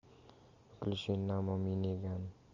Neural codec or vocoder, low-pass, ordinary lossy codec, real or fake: none; 7.2 kHz; AAC, 48 kbps; real